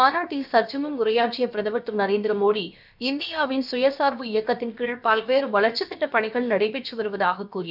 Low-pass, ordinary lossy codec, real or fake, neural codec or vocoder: 5.4 kHz; none; fake; codec, 16 kHz, about 1 kbps, DyCAST, with the encoder's durations